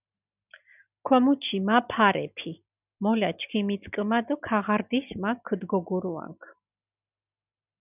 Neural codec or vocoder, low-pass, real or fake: none; 3.6 kHz; real